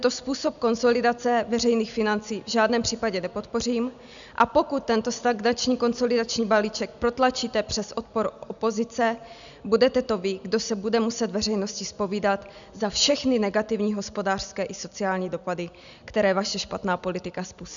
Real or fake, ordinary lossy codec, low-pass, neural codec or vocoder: real; MP3, 96 kbps; 7.2 kHz; none